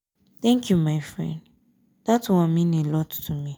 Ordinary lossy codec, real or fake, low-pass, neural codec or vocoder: none; real; none; none